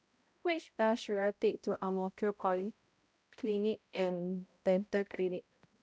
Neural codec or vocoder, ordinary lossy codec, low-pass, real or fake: codec, 16 kHz, 0.5 kbps, X-Codec, HuBERT features, trained on balanced general audio; none; none; fake